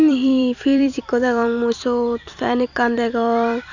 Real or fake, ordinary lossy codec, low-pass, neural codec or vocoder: fake; none; 7.2 kHz; vocoder, 44.1 kHz, 128 mel bands every 256 samples, BigVGAN v2